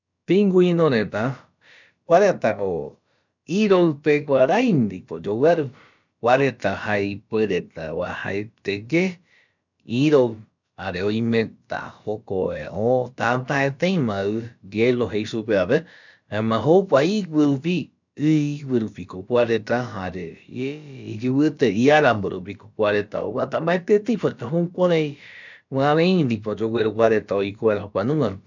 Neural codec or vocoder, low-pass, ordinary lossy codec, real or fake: codec, 16 kHz, about 1 kbps, DyCAST, with the encoder's durations; 7.2 kHz; none; fake